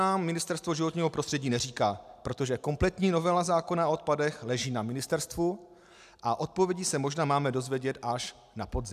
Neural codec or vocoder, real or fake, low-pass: none; real; 14.4 kHz